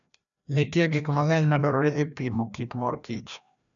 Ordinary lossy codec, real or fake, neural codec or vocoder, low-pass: none; fake; codec, 16 kHz, 1 kbps, FreqCodec, larger model; 7.2 kHz